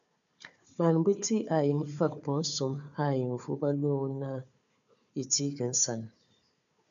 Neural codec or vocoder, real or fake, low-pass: codec, 16 kHz, 4 kbps, FunCodec, trained on Chinese and English, 50 frames a second; fake; 7.2 kHz